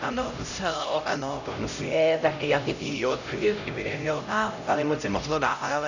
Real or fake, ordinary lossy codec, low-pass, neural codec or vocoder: fake; none; 7.2 kHz; codec, 16 kHz, 0.5 kbps, X-Codec, HuBERT features, trained on LibriSpeech